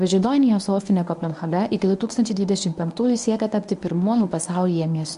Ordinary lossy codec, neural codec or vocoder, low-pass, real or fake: MP3, 96 kbps; codec, 24 kHz, 0.9 kbps, WavTokenizer, medium speech release version 1; 10.8 kHz; fake